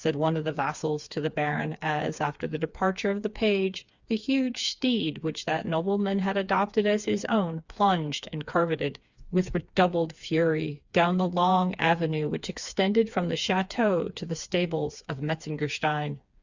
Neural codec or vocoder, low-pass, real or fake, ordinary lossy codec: codec, 16 kHz, 4 kbps, FreqCodec, smaller model; 7.2 kHz; fake; Opus, 64 kbps